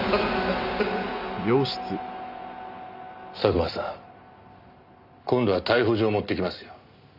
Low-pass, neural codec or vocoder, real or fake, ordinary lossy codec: 5.4 kHz; none; real; none